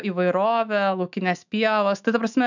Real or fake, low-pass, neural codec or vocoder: fake; 7.2 kHz; autoencoder, 48 kHz, 128 numbers a frame, DAC-VAE, trained on Japanese speech